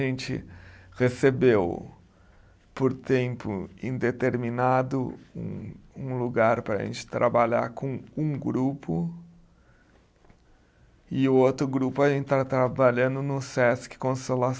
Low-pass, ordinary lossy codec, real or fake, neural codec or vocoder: none; none; real; none